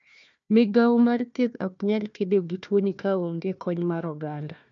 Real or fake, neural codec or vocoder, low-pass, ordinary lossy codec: fake; codec, 16 kHz, 1 kbps, FunCodec, trained on Chinese and English, 50 frames a second; 7.2 kHz; MP3, 64 kbps